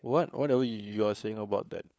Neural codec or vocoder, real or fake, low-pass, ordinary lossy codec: codec, 16 kHz, 4 kbps, FunCodec, trained on LibriTTS, 50 frames a second; fake; none; none